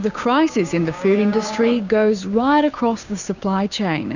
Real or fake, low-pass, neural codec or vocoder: fake; 7.2 kHz; codec, 16 kHz, 6 kbps, DAC